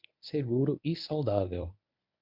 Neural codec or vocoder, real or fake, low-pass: codec, 24 kHz, 0.9 kbps, WavTokenizer, medium speech release version 1; fake; 5.4 kHz